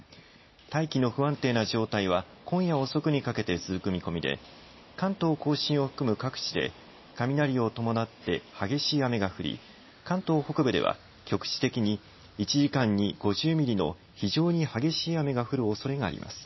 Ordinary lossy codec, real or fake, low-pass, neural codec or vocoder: MP3, 24 kbps; real; 7.2 kHz; none